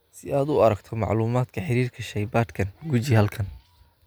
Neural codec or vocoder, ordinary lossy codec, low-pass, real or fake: none; none; none; real